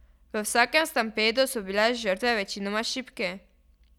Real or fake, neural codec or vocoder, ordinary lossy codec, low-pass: real; none; none; 19.8 kHz